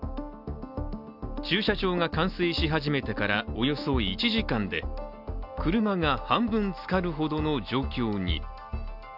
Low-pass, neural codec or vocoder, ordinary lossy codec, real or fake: 5.4 kHz; none; none; real